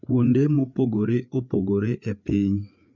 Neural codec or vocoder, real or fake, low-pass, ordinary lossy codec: codec, 16 kHz, 4 kbps, FreqCodec, larger model; fake; 7.2 kHz; MP3, 64 kbps